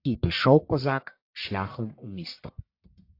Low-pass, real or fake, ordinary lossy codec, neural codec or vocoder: 5.4 kHz; fake; AAC, 32 kbps; codec, 44.1 kHz, 1.7 kbps, Pupu-Codec